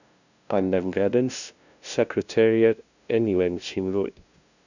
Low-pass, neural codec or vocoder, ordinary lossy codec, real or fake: 7.2 kHz; codec, 16 kHz, 0.5 kbps, FunCodec, trained on LibriTTS, 25 frames a second; none; fake